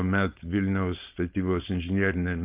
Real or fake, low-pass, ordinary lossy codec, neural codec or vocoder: fake; 3.6 kHz; Opus, 32 kbps; codec, 16 kHz, 4.8 kbps, FACodec